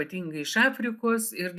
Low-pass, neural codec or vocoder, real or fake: 14.4 kHz; none; real